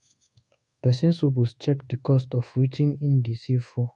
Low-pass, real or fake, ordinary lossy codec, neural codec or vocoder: 10.8 kHz; fake; AAC, 48 kbps; codec, 24 kHz, 1.2 kbps, DualCodec